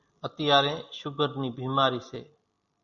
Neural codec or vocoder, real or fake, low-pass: none; real; 7.2 kHz